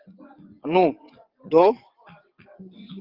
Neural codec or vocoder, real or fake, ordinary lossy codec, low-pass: vocoder, 22.05 kHz, 80 mel bands, WaveNeXt; fake; Opus, 24 kbps; 5.4 kHz